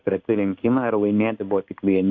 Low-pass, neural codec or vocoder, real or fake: 7.2 kHz; codec, 16 kHz in and 24 kHz out, 1 kbps, XY-Tokenizer; fake